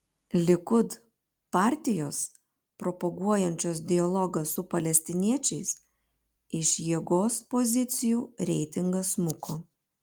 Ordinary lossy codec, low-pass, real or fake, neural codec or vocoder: Opus, 32 kbps; 19.8 kHz; real; none